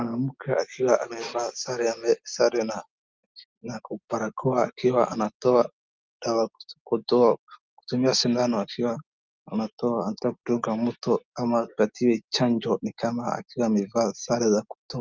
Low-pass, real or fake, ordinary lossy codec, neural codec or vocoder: 7.2 kHz; real; Opus, 24 kbps; none